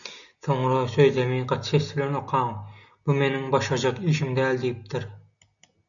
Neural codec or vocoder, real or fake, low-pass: none; real; 7.2 kHz